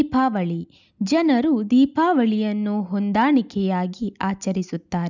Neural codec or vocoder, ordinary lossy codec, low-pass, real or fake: none; none; 7.2 kHz; real